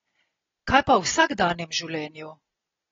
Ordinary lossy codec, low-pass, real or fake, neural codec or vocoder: AAC, 24 kbps; 7.2 kHz; real; none